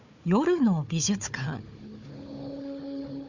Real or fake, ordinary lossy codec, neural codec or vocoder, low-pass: fake; none; codec, 16 kHz, 4 kbps, FunCodec, trained on Chinese and English, 50 frames a second; 7.2 kHz